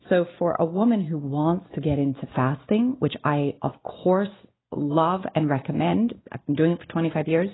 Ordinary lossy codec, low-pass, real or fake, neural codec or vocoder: AAC, 16 kbps; 7.2 kHz; real; none